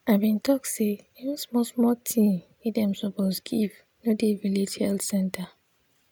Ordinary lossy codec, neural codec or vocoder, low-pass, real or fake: none; none; none; real